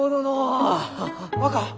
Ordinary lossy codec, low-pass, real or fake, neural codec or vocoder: none; none; real; none